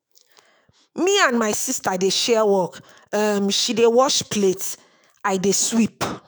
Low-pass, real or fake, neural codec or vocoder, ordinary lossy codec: none; fake; autoencoder, 48 kHz, 128 numbers a frame, DAC-VAE, trained on Japanese speech; none